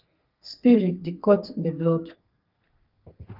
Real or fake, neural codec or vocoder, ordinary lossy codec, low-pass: fake; codec, 32 kHz, 1.9 kbps, SNAC; Opus, 32 kbps; 5.4 kHz